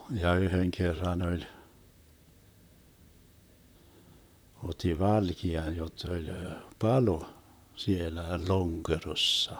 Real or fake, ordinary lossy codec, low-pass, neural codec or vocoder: fake; none; none; vocoder, 44.1 kHz, 128 mel bands, Pupu-Vocoder